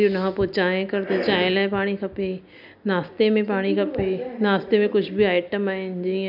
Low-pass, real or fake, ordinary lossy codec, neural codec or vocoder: 5.4 kHz; real; none; none